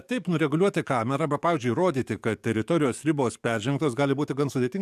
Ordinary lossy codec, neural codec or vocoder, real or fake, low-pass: MP3, 96 kbps; codec, 44.1 kHz, 7.8 kbps, DAC; fake; 14.4 kHz